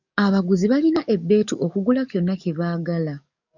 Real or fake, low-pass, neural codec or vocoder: fake; 7.2 kHz; codec, 44.1 kHz, 7.8 kbps, DAC